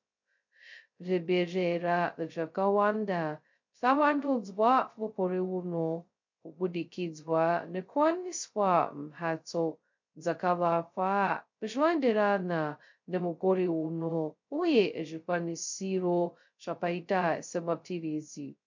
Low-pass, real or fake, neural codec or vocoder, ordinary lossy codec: 7.2 kHz; fake; codec, 16 kHz, 0.2 kbps, FocalCodec; MP3, 48 kbps